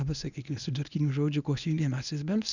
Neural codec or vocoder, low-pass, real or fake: codec, 24 kHz, 0.9 kbps, WavTokenizer, medium speech release version 1; 7.2 kHz; fake